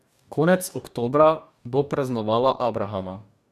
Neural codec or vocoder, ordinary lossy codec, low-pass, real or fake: codec, 44.1 kHz, 2.6 kbps, DAC; none; 14.4 kHz; fake